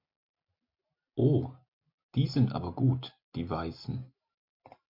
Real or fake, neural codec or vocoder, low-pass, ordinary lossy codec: real; none; 5.4 kHz; MP3, 32 kbps